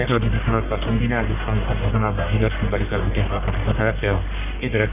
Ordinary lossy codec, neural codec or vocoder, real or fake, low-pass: none; codec, 44.1 kHz, 1.7 kbps, Pupu-Codec; fake; 3.6 kHz